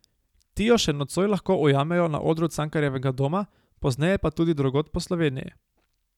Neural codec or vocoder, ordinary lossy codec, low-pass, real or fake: none; none; 19.8 kHz; real